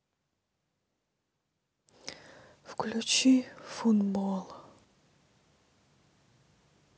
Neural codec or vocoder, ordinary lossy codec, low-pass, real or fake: none; none; none; real